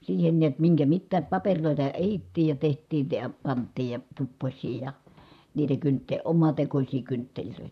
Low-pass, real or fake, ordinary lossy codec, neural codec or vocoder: 14.4 kHz; fake; none; vocoder, 44.1 kHz, 128 mel bands, Pupu-Vocoder